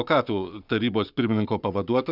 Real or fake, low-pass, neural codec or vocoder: fake; 5.4 kHz; codec, 44.1 kHz, 7.8 kbps, Pupu-Codec